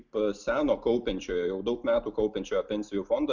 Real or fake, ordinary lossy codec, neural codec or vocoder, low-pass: real; Opus, 64 kbps; none; 7.2 kHz